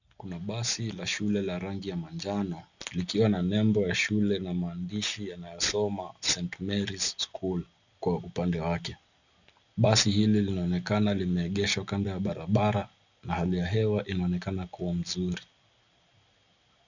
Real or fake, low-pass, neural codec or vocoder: real; 7.2 kHz; none